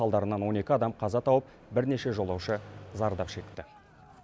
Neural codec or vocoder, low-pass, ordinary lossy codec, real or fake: none; none; none; real